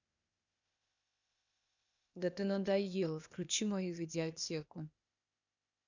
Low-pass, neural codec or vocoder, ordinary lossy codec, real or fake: 7.2 kHz; codec, 16 kHz, 0.8 kbps, ZipCodec; none; fake